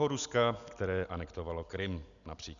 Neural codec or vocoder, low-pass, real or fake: none; 7.2 kHz; real